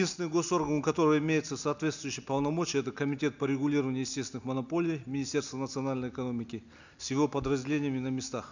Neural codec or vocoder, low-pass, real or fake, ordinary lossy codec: none; 7.2 kHz; real; none